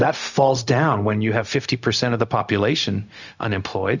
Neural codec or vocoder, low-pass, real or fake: codec, 16 kHz, 0.4 kbps, LongCat-Audio-Codec; 7.2 kHz; fake